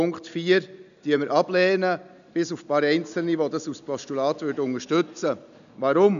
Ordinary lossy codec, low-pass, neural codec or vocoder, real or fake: none; 7.2 kHz; none; real